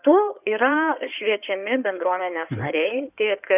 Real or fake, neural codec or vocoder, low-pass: fake; codec, 16 kHz in and 24 kHz out, 2.2 kbps, FireRedTTS-2 codec; 3.6 kHz